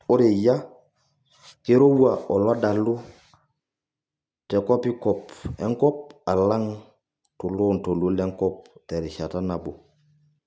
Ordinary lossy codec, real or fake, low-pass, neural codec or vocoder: none; real; none; none